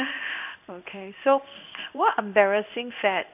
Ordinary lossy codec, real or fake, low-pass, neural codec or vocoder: none; fake; 3.6 kHz; codec, 16 kHz in and 24 kHz out, 1 kbps, XY-Tokenizer